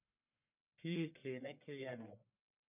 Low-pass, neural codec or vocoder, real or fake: 3.6 kHz; codec, 44.1 kHz, 1.7 kbps, Pupu-Codec; fake